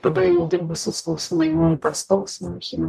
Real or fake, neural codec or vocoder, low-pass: fake; codec, 44.1 kHz, 0.9 kbps, DAC; 14.4 kHz